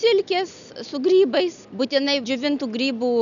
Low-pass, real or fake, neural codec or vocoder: 7.2 kHz; real; none